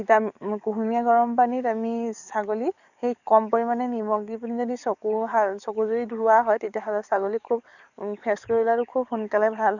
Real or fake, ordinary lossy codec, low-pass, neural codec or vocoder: fake; none; 7.2 kHz; codec, 44.1 kHz, 7.8 kbps, DAC